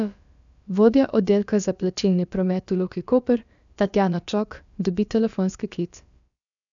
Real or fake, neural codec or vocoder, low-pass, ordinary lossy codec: fake; codec, 16 kHz, about 1 kbps, DyCAST, with the encoder's durations; 7.2 kHz; none